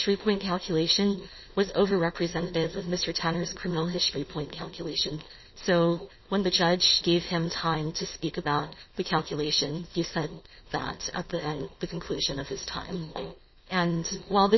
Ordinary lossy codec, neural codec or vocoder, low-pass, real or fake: MP3, 24 kbps; codec, 16 kHz, 4.8 kbps, FACodec; 7.2 kHz; fake